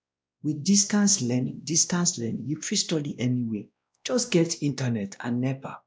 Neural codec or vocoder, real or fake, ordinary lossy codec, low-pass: codec, 16 kHz, 1 kbps, X-Codec, WavLM features, trained on Multilingual LibriSpeech; fake; none; none